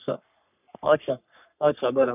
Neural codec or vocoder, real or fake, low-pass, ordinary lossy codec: codec, 44.1 kHz, 3.4 kbps, Pupu-Codec; fake; 3.6 kHz; none